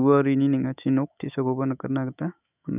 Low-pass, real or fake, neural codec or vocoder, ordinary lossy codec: 3.6 kHz; fake; autoencoder, 48 kHz, 128 numbers a frame, DAC-VAE, trained on Japanese speech; none